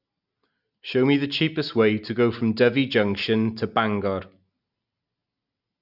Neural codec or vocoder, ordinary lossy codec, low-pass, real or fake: none; none; 5.4 kHz; real